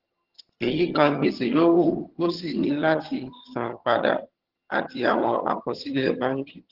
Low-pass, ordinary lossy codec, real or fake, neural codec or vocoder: 5.4 kHz; Opus, 16 kbps; fake; vocoder, 22.05 kHz, 80 mel bands, HiFi-GAN